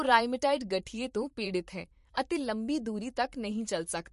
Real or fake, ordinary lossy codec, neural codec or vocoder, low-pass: fake; MP3, 48 kbps; vocoder, 44.1 kHz, 128 mel bands, Pupu-Vocoder; 14.4 kHz